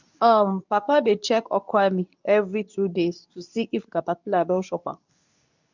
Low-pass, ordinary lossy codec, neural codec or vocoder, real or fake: 7.2 kHz; none; codec, 24 kHz, 0.9 kbps, WavTokenizer, medium speech release version 2; fake